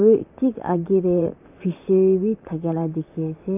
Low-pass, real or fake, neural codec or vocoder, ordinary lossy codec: 3.6 kHz; fake; vocoder, 44.1 kHz, 128 mel bands, Pupu-Vocoder; AAC, 32 kbps